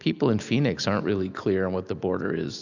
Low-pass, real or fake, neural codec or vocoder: 7.2 kHz; real; none